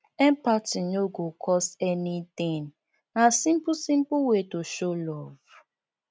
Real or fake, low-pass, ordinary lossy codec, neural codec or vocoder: real; none; none; none